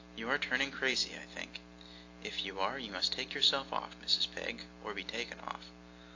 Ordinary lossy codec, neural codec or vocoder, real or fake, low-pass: AAC, 48 kbps; none; real; 7.2 kHz